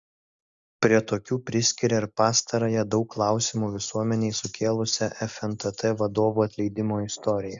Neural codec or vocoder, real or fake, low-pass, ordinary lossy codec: none; real; 7.2 kHz; Opus, 64 kbps